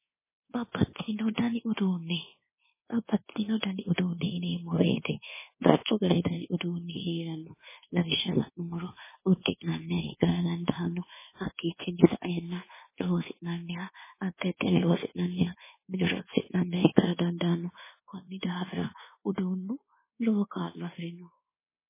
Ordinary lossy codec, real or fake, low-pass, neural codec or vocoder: MP3, 16 kbps; fake; 3.6 kHz; codec, 24 kHz, 1.2 kbps, DualCodec